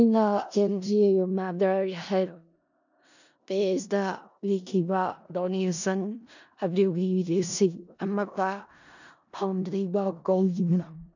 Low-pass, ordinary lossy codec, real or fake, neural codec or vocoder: 7.2 kHz; none; fake; codec, 16 kHz in and 24 kHz out, 0.4 kbps, LongCat-Audio-Codec, four codebook decoder